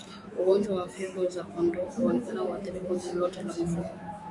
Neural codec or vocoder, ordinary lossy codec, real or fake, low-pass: autoencoder, 48 kHz, 128 numbers a frame, DAC-VAE, trained on Japanese speech; MP3, 48 kbps; fake; 10.8 kHz